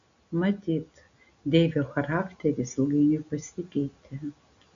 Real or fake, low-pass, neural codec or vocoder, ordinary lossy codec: real; 7.2 kHz; none; AAC, 64 kbps